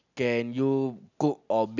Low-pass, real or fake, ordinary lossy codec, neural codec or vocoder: 7.2 kHz; real; none; none